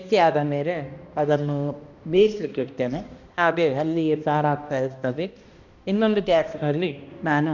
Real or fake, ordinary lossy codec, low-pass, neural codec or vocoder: fake; Opus, 64 kbps; 7.2 kHz; codec, 16 kHz, 1 kbps, X-Codec, HuBERT features, trained on balanced general audio